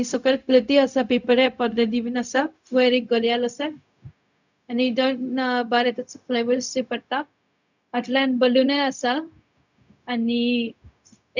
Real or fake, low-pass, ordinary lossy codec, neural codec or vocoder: fake; 7.2 kHz; none; codec, 16 kHz, 0.4 kbps, LongCat-Audio-Codec